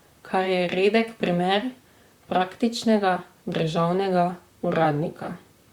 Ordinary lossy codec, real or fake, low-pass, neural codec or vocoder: Opus, 64 kbps; fake; 19.8 kHz; vocoder, 44.1 kHz, 128 mel bands, Pupu-Vocoder